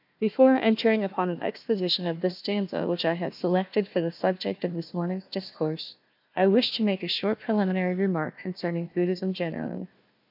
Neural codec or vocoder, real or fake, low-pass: codec, 16 kHz, 1 kbps, FunCodec, trained on Chinese and English, 50 frames a second; fake; 5.4 kHz